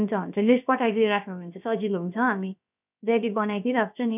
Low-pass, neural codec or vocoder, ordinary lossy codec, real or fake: 3.6 kHz; codec, 16 kHz, about 1 kbps, DyCAST, with the encoder's durations; none; fake